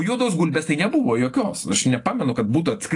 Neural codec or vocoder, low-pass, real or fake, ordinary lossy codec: none; 10.8 kHz; real; AAC, 48 kbps